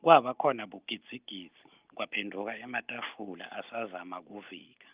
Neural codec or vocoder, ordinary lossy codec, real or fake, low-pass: none; Opus, 32 kbps; real; 3.6 kHz